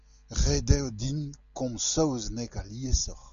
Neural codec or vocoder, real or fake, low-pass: none; real; 7.2 kHz